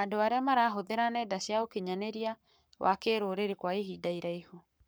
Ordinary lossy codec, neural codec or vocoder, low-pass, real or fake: none; codec, 44.1 kHz, 7.8 kbps, Pupu-Codec; none; fake